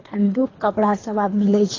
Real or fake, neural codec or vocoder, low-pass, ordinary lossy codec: fake; codec, 24 kHz, 3 kbps, HILCodec; 7.2 kHz; AAC, 32 kbps